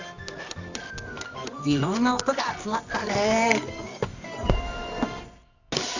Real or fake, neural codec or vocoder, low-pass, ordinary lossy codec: fake; codec, 24 kHz, 0.9 kbps, WavTokenizer, medium music audio release; 7.2 kHz; none